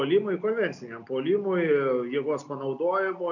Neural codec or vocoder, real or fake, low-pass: none; real; 7.2 kHz